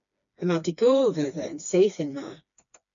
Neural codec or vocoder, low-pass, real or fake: codec, 16 kHz, 2 kbps, FreqCodec, smaller model; 7.2 kHz; fake